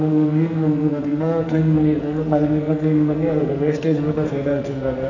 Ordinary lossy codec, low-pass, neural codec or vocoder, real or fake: none; 7.2 kHz; codec, 44.1 kHz, 2.6 kbps, SNAC; fake